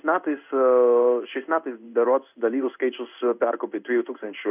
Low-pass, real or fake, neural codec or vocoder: 3.6 kHz; fake; codec, 16 kHz in and 24 kHz out, 1 kbps, XY-Tokenizer